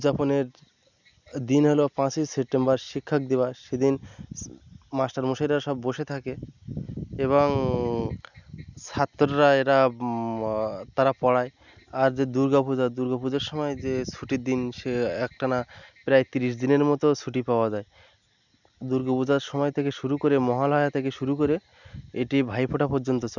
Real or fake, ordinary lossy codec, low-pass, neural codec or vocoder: real; none; 7.2 kHz; none